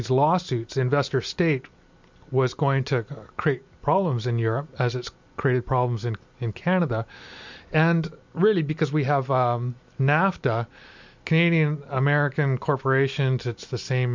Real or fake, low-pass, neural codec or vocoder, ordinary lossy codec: real; 7.2 kHz; none; MP3, 64 kbps